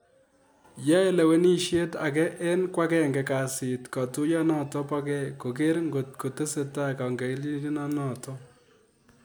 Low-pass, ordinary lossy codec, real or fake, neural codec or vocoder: none; none; real; none